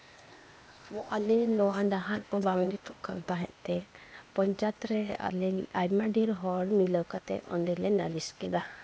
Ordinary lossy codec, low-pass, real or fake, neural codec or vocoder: none; none; fake; codec, 16 kHz, 0.8 kbps, ZipCodec